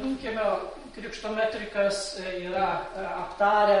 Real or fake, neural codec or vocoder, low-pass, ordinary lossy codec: fake; vocoder, 44.1 kHz, 128 mel bands every 256 samples, BigVGAN v2; 19.8 kHz; MP3, 48 kbps